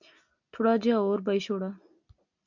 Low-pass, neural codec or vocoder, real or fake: 7.2 kHz; none; real